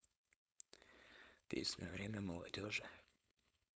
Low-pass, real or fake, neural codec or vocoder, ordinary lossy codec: none; fake; codec, 16 kHz, 4.8 kbps, FACodec; none